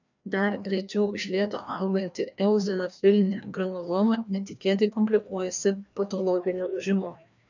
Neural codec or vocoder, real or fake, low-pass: codec, 16 kHz, 1 kbps, FreqCodec, larger model; fake; 7.2 kHz